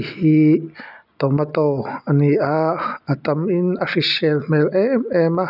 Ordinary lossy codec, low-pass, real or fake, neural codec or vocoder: none; 5.4 kHz; real; none